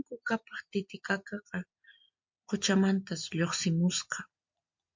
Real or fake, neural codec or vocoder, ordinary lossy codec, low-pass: real; none; MP3, 48 kbps; 7.2 kHz